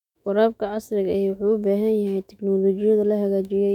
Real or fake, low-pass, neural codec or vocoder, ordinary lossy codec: fake; 19.8 kHz; autoencoder, 48 kHz, 128 numbers a frame, DAC-VAE, trained on Japanese speech; none